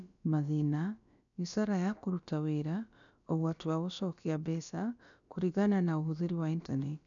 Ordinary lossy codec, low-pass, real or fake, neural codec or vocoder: none; 7.2 kHz; fake; codec, 16 kHz, about 1 kbps, DyCAST, with the encoder's durations